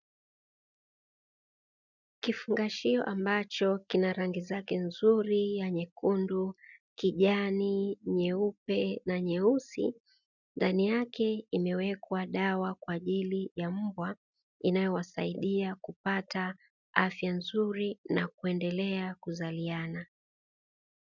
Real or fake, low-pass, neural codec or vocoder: real; 7.2 kHz; none